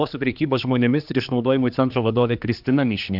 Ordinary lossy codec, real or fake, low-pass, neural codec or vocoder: AAC, 48 kbps; fake; 5.4 kHz; codec, 16 kHz, 2 kbps, X-Codec, HuBERT features, trained on general audio